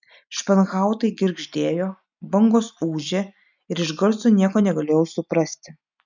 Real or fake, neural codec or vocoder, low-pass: real; none; 7.2 kHz